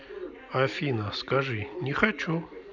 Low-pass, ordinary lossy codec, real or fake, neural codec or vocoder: 7.2 kHz; none; real; none